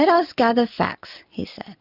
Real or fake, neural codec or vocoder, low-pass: real; none; 5.4 kHz